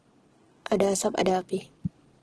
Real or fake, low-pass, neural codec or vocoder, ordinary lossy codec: real; 9.9 kHz; none; Opus, 16 kbps